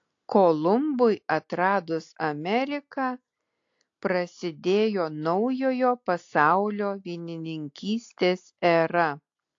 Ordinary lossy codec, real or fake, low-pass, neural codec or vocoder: AAC, 48 kbps; real; 7.2 kHz; none